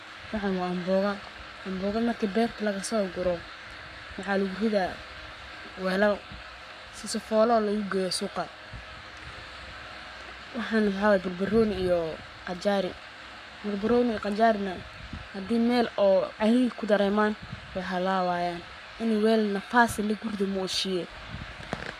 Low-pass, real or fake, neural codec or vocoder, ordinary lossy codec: 14.4 kHz; fake; codec, 44.1 kHz, 7.8 kbps, Pupu-Codec; none